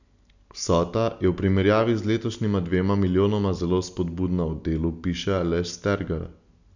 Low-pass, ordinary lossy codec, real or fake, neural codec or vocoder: 7.2 kHz; none; real; none